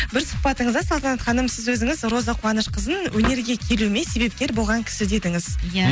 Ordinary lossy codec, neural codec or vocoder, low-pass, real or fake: none; none; none; real